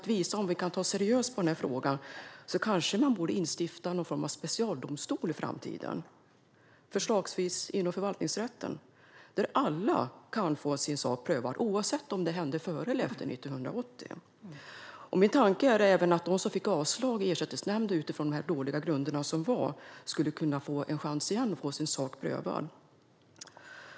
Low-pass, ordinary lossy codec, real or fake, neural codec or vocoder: none; none; real; none